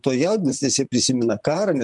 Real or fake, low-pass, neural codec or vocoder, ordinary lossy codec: fake; 10.8 kHz; vocoder, 44.1 kHz, 128 mel bands, Pupu-Vocoder; MP3, 96 kbps